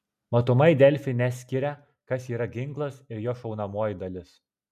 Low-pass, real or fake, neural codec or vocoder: 14.4 kHz; real; none